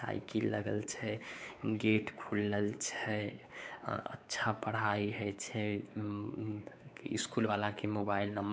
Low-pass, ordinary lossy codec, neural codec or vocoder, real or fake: none; none; codec, 16 kHz, 4 kbps, X-Codec, WavLM features, trained on Multilingual LibriSpeech; fake